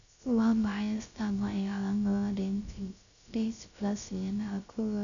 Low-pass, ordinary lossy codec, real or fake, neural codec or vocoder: 7.2 kHz; MP3, 96 kbps; fake; codec, 16 kHz, 0.3 kbps, FocalCodec